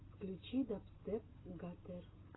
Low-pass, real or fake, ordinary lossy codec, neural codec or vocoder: 7.2 kHz; real; AAC, 16 kbps; none